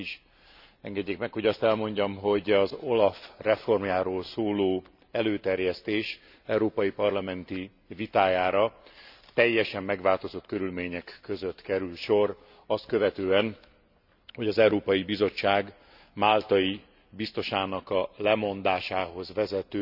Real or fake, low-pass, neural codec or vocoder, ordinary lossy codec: real; 5.4 kHz; none; none